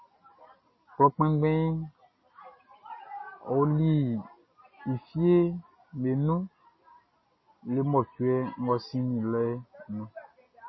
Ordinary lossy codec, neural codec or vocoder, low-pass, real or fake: MP3, 24 kbps; none; 7.2 kHz; real